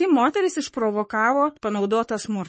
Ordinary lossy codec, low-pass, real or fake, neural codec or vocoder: MP3, 32 kbps; 10.8 kHz; fake; codec, 44.1 kHz, 3.4 kbps, Pupu-Codec